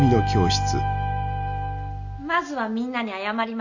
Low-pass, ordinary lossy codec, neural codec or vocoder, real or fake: 7.2 kHz; none; none; real